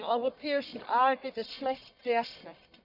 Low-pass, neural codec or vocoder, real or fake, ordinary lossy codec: 5.4 kHz; codec, 44.1 kHz, 1.7 kbps, Pupu-Codec; fake; none